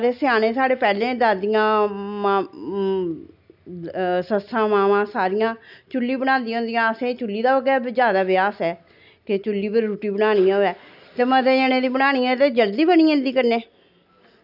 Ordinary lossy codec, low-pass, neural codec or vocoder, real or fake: none; 5.4 kHz; none; real